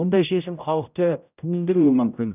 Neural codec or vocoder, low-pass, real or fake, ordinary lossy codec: codec, 16 kHz, 0.5 kbps, X-Codec, HuBERT features, trained on general audio; 3.6 kHz; fake; none